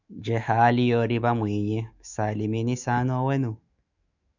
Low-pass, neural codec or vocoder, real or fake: 7.2 kHz; autoencoder, 48 kHz, 128 numbers a frame, DAC-VAE, trained on Japanese speech; fake